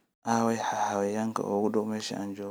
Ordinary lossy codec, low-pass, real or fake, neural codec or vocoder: none; none; real; none